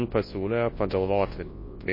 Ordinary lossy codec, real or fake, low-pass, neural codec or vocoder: MP3, 24 kbps; fake; 5.4 kHz; codec, 24 kHz, 0.9 kbps, WavTokenizer, large speech release